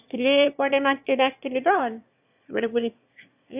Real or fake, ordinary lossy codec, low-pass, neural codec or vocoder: fake; AAC, 32 kbps; 3.6 kHz; autoencoder, 22.05 kHz, a latent of 192 numbers a frame, VITS, trained on one speaker